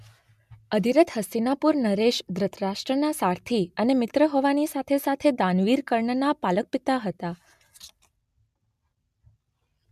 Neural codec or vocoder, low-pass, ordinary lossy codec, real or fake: none; 14.4 kHz; MP3, 96 kbps; real